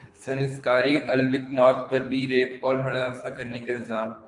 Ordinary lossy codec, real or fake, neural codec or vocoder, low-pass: AAC, 64 kbps; fake; codec, 24 kHz, 3 kbps, HILCodec; 10.8 kHz